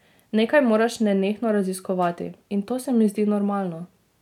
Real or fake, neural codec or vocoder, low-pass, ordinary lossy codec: real; none; 19.8 kHz; none